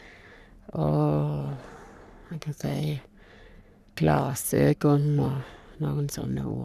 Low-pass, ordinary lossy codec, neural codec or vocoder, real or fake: 14.4 kHz; none; codec, 44.1 kHz, 3.4 kbps, Pupu-Codec; fake